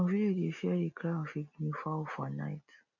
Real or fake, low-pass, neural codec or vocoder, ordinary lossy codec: real; 7.2 kHz; none; none